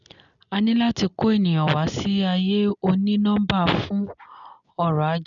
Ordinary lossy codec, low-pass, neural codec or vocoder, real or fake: none; 7.2 kHz; none; real